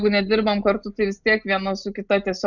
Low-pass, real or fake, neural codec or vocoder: 7.2 kHz; real; none